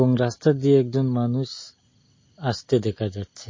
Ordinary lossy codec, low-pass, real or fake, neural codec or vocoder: MP3, 32 kbps; 7.2 kHz; real; none